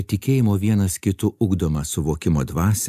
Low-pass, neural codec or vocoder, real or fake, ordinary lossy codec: 14.4 kHz; none; real; AAC, 96 kbps